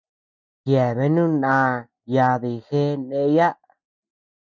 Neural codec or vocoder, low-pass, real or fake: none; 7.2 kHz; real